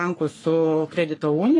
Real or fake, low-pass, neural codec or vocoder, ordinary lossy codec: fake; 10.8 kHz; codec, 44.1 kHz, 3.4 kbps, Pupu-Codec; AAC, 32 kbps